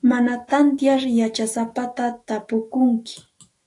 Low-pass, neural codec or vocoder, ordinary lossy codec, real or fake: 10.8 kHz; autoencoder, 48 kHz, 128 numbers a frame, DAC-VAE, trained on Japanese speech; AAC, 48 kbps; fake